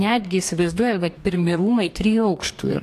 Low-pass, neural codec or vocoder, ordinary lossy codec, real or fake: 14.4 kHz; codec, 44.1 kHz, 2.6 kbps, DAC; AAC, 64 kbps; fake